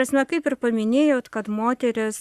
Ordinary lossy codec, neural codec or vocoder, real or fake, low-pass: Opus, 64 kbps; codec, 44.1 kHz, 7.8 kbps, Pupu-Codec; fake; 14.4 kHz